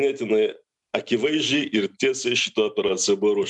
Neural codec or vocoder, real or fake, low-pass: none; real; 10.8 kHz